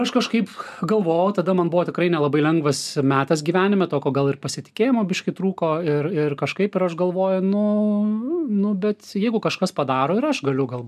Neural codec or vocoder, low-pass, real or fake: none; 14.4 kHz; real